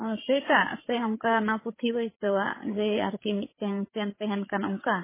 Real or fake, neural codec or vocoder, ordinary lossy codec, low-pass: fake; codec, 16 kHz, 8 kbps, FreqCodec, larger model; MP3, 16 kbps; 3.6 kHz